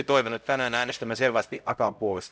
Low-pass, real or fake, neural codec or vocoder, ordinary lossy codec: none; fake; codec, 16 kHz, 0.5 kbps, X-Codec, HuBERT features, trained on LibriSpeech; none